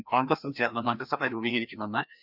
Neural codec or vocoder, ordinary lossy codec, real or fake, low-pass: codec, 16 kHz, 1 kbps, FreqCodec, larger model; none; fake; 5.4 kHz